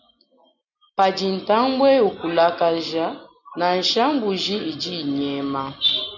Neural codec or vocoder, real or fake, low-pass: none; real; 7.2 kHz